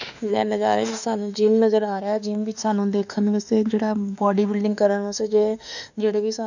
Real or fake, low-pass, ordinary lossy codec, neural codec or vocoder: fake; 7.2 kHz; none; autoencoder, 48 kHz, 32 numbers a frame, DAC-VAE, trained on Japanese speech